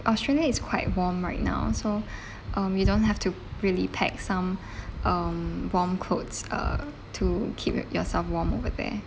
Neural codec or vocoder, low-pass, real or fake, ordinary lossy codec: none; none; real; none